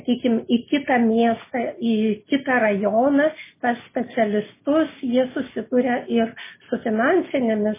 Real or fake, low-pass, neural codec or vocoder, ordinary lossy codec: real; 3.6 kHz; none; MP3, 16 kbps